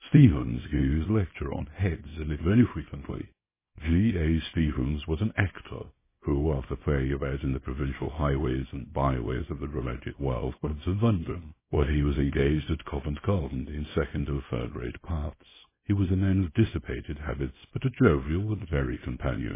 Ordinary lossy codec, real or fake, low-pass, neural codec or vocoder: MP3, 16 kbps; fake; 3.6 kHz; codec, 24 kHz, 0.9 kbps, WavTokenizer, small release